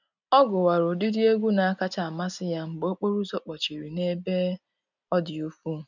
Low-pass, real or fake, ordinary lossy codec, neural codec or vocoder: 7.2 kHz; real; none; none